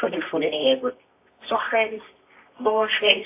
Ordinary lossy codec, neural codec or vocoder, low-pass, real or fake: AAC, 24 kbps; codec, 24 kHz, 0.9 kbps, WavTokenizer, medium music audio release; 3.6 kHz; fake